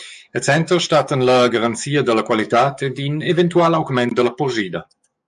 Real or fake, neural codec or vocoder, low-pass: fake; codec, 44.1 kHz, 7.8 kbps, DAC; 10.8 kHz